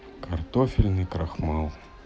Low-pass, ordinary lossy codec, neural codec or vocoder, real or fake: none; none; none; real